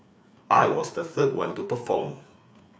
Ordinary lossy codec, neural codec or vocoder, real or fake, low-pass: none; codec, 16 kHz, 4 kbps, FreqCodec, larger model; fake; none